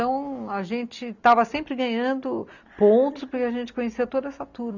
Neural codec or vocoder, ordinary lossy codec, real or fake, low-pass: none; none; real; 7.2 kHz